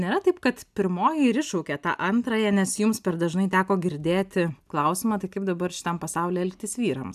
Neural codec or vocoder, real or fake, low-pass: none; real; 14.4 kHz